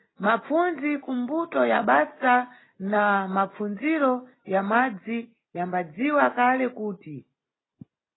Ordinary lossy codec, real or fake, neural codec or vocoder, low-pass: AAC, 16 kbps; real; none; 7.2 kHz